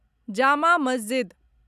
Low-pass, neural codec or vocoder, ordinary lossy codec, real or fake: 14.4 kHz; none; none; real